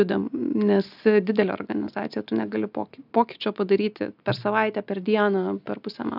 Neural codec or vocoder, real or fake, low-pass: none; real; 5.4 kHz